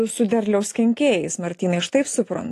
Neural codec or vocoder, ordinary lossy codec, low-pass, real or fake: none; AAC, 48 kbps; 14.4 kHz; real